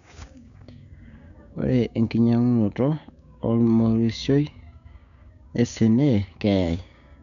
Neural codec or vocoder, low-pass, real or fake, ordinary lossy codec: codec, 16 kHz, 6 kbps, DAC; 7.2 kHz; fake; none